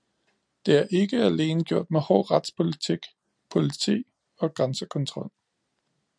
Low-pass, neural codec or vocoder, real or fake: 9.9 kHz; none; real